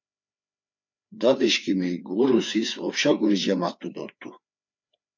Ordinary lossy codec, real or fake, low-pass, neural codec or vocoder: MP3, 64 kbps; fake; 7.2 kHz; codec, 16 kHz, 4 kbps, FreqCodec, larger model